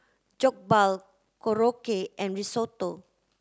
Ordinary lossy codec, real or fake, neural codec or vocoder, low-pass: none; real; none; none